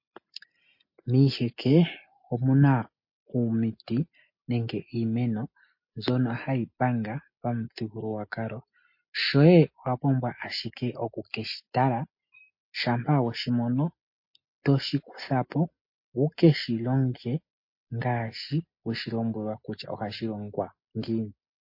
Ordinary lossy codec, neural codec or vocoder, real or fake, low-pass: MP3, 32 kbps; none; real; 5.4 kHz